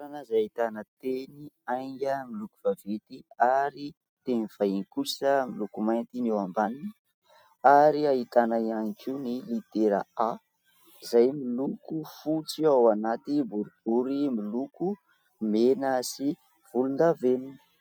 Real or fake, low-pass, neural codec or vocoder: real; 19.8 kHz; none